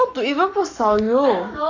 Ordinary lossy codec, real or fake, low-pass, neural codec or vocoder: none; fake; 7.2 kHz; codec, 44.1 kHz, 7.8 kbps, Pupu-Codec